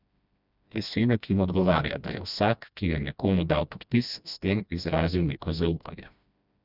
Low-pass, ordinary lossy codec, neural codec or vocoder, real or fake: 5.4 kHz; none; codec, 16 kHz, 1 kbps, FreqCodec, smaller model; fake